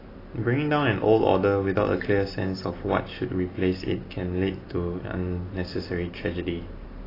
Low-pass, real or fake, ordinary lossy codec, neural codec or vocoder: 5.4 kHz; real; AAC, 24 kbps; none